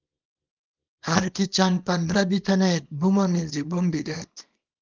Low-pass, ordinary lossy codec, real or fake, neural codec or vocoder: 7.2 kHz; Opus, 32 kbps; fake; codec, 24 kHz, 0.9 kbps, WavTokenizer, small release